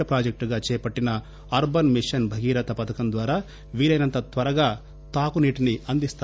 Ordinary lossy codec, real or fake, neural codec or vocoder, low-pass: none; real; none; none